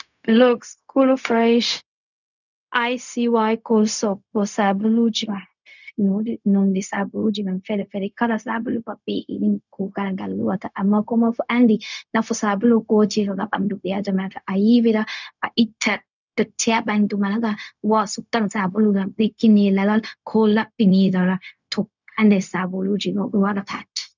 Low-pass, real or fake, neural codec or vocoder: 7.2 kHz; fake; codec, 16 kHz, 0.4 kbps, LongCat-Audio-Codec